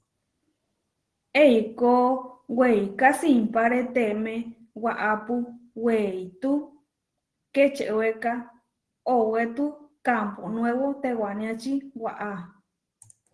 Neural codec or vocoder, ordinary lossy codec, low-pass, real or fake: none; Opus, 16 kbps; 10.8 kHz; real